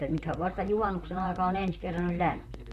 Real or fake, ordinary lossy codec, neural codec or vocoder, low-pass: fake; none; vocoder, 44.1 kHz, 128 mel bands, Pupu-Vocoder; 14.4 kHz